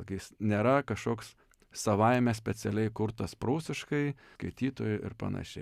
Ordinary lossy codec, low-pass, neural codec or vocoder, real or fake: Opus, 64 kbps; 14.4 kHz; none; real